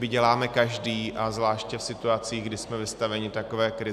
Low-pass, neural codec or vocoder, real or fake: 14.4 kHz; none; real